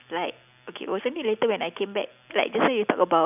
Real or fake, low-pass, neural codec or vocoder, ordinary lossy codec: real; 3.6 kHz; none; none